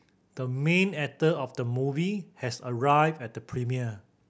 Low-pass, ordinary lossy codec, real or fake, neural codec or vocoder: none; none; real; none